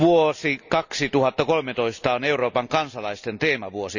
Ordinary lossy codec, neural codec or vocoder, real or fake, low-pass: none; none; real; 7.2 kHz